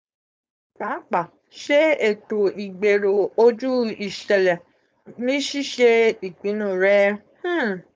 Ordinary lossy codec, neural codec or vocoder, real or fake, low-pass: none; codec, 16 kHz, 4.8 kbps, FACodec; fake; none